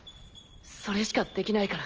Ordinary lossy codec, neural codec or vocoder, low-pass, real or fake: Opus, 24 kbps; none; 7.2 kHz; real